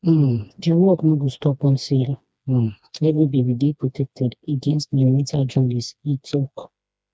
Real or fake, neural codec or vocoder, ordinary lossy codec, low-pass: fake; codec, 16 kHz, 2 kbps, FreqCodec, smaller model; none; none